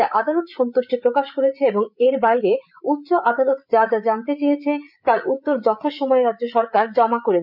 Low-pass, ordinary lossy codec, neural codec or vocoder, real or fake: 5.4 kHz; none; codec, 16 kHz, 8 kbps, FreqCodec, larger model; fake